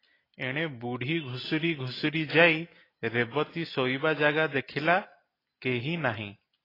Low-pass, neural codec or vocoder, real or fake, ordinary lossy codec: 5.4 kHz; none; real; AAC, 24 kbps